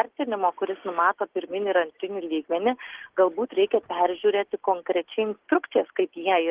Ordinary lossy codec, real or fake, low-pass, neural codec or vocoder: Opus, 16 kbps; real; 3.6 kHz; none